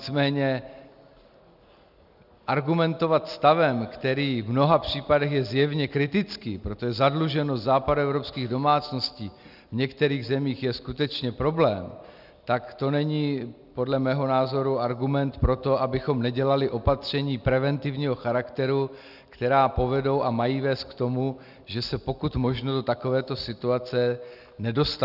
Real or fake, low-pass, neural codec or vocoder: real; 5.4 kHz; none